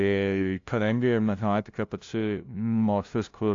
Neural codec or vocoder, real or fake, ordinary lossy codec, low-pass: codec, 16 kHz, 0.5 kbps, FunCodec, trained on LibriTTS, 25 frames a second; fake; AAC, 48 kbps; 7.2 kHz